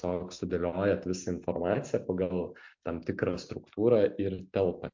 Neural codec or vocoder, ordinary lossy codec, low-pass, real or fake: none; MP3, 48 kbps; 7.2 kHz; real